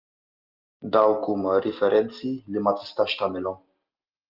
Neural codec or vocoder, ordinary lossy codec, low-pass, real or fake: none; Opus, 24 kbps; 5.4 kHz; real